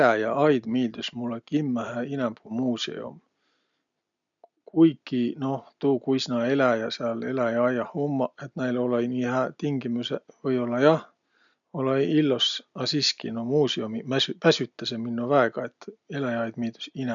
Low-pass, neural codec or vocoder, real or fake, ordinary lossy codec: 7.2 kHz; none; real; none